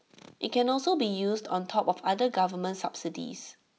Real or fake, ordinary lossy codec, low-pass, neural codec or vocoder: real; none; none; none